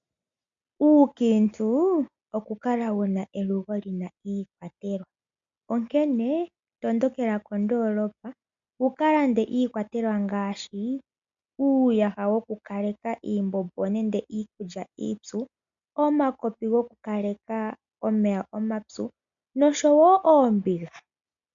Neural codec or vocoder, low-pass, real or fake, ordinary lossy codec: none; 7.2 kHz; real; MP3, 64 kbps